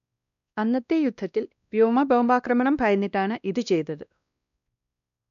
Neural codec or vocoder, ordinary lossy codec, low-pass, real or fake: codec, 16 kHz, 1 kbps, X-Codec, WavLM features, trained on Multilingual LibriSpeech; none; 7.2 kHz; fake